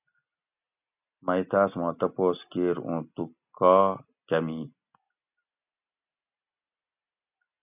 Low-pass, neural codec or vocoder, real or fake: 3.6 kHz; none; real